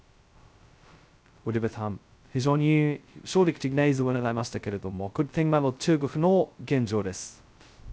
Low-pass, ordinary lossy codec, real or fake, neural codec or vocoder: none; none; fake; codec, 16 kHz, 0.2 kbps, FocalCodec